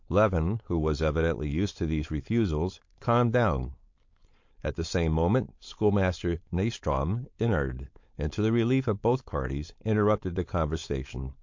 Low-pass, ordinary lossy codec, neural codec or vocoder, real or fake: 7.2 kHz; MP3, 48 kbps; codec, 16 kHz, 4.8 kbps, FACodec; fake